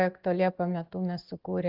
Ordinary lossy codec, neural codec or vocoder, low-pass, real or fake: Opus, 16 kbps; none; 5.4 kHz; real